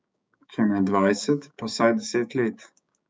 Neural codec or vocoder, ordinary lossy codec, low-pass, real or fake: codec, 16 kHz, 6 kbps, DAC; none; none; fake